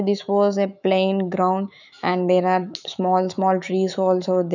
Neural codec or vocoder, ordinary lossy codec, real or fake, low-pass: autoencoder, 48 kHz, 128 numbers a frame, DAC-VAE, trained on Japanese speech; none; fake; 7.2 kHz